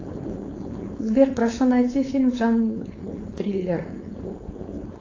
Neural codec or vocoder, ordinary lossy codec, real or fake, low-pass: codec, 16 kHz, 4.8 kbps, FACodec; AAC, 32 kbps; fake; 7.2 kHz